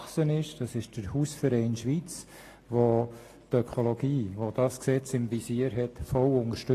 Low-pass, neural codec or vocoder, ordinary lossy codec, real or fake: 14.4 kHz; none; AAC, 48 kbps; real